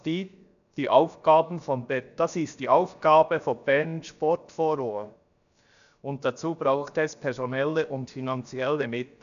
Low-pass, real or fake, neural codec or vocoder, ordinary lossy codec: 7.2 kHz; fake; codec, 16 kHz, about 1 kbps, DyCAST, with the encoder's durations; none